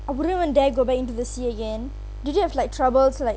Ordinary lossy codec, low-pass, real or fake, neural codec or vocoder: none; none; real; none